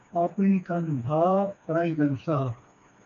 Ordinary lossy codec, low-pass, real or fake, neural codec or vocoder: AAC, 64 kbps; 7.2 kHz; fake; codec, 16 kHz, 2 kbps, FreqCodec, smaller model